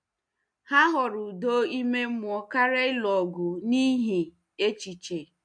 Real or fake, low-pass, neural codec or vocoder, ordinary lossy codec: real; 9.9 kHz; none; MP3, 64 kbps